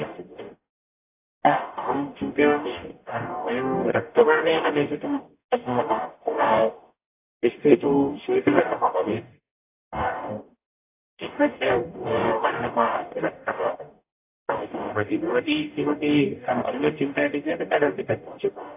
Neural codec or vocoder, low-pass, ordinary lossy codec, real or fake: codec, 44.1 kHz, 0.9 kbps, DAC; 3.6 kHz; AAC, 32 kbps; fake